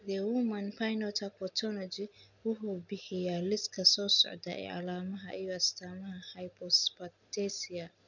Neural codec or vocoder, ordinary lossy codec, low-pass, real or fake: none; none; 7.2 kHz; real